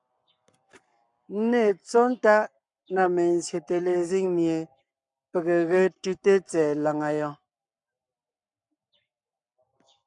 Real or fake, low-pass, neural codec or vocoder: fake; 10.8 kHz; codec, 44.1 kHz, 7.8 kbps, Pupu-Codec